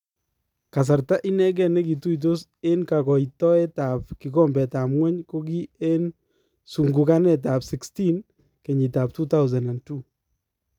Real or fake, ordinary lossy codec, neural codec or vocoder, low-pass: real; none; none; 19.8 kHz